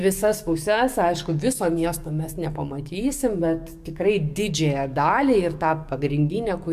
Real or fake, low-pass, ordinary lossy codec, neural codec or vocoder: fake; 14.4 kHz; MP3, 96 kbps; codec, 44.1 kHz, 7.8 kbps, DAC